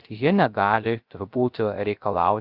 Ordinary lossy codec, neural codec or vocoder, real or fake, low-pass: Opus, 24 kbps; codec, 16 kHz, 0.3 kbps, FocalCodec; fake; 5.4 kHz